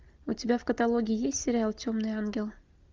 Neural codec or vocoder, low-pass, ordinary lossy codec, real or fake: none; 7.2 kHz; Opus, 24 kbps; real